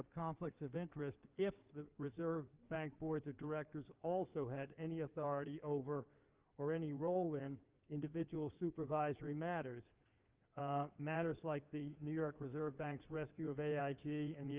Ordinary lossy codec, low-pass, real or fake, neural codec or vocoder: Opus, 32 kbps; 3.6 kHz; fake; codec, 16 kHz in and 24 kHz out, 2.2 kbps, FireRedTTS-2 codec